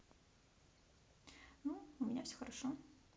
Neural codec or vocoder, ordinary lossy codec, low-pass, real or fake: none; none; none; real